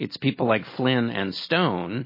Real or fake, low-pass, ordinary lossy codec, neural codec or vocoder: real; 5.4 kHz; MP3, 24 kbps; none